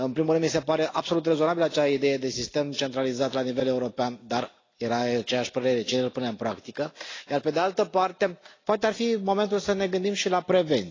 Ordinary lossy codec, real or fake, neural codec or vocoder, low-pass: AAC, 32 kbps; real; none; 7.2 kHz